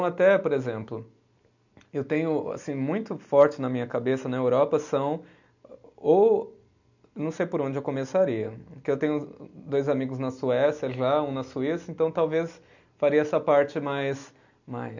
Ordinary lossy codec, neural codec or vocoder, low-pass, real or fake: none; none; 7.2 kHz; real